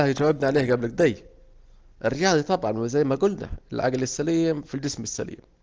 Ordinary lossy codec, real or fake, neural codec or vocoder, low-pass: Opus, 16 kbps; real; none; 7.2 kHz